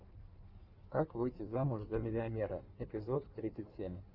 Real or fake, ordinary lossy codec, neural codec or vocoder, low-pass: fake; Opus, 24 kbps; codec, 16 kHz in and 24 kHz out, 1.1 kbps, FireRedTTS-2 codec; 5.4 kHz